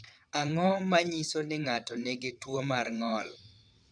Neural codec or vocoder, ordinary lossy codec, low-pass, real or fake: vocoder, 22.05 kHz, 80 mel bands, WaveNeXt; none; 9.9 kHz; fake